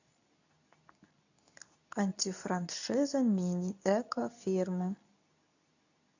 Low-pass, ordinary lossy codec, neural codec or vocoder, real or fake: 7.2 kHz; none; codec, 24 kHz, 0.9 kbps, WavTokenizer, medium speech release version 2; fake